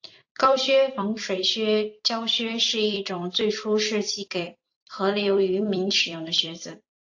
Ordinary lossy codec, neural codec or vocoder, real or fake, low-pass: AAC, 48 kbps; vocoder, 44.1 kHz, 128 mel bands every 256 samples, BigVGAN v2; fake; 7.2 kHz